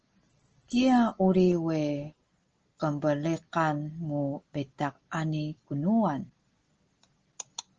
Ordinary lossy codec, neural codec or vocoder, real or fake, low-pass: Opus, 16 kbps; none; real; 7.2 kHz